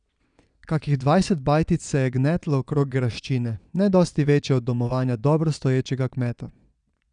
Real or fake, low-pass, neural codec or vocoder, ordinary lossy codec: fake; 9.9 kHz; vocoder, 22.05 kHz, 80 mel bands, Vocos; none